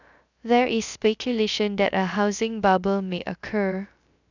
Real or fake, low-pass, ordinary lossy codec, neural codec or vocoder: fake; 7.2 kHz; none; codec, 16 kHz, 0.3 kbps, FocalCodec